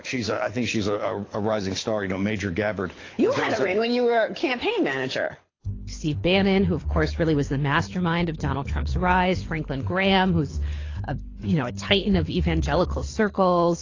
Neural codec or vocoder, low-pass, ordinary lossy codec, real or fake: codec, 24 kHz, 6 kbps, HILCodec; 7.2 kHz; AAC, 32 kbps; fake